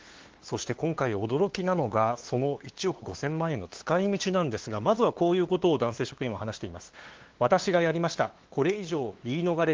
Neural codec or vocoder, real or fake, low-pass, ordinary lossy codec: codec, 16 kHz, 2 kbps, FunCodec, trained on LibriTTS, 25 frames a second; fake; 7.2 kHz; Opus, 16 kbps